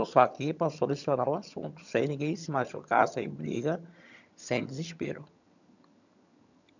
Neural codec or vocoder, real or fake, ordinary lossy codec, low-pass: vocoder, 22.05 kHz, 80 mel bands, HiFi-GAN; fake; none; 7.2 kHz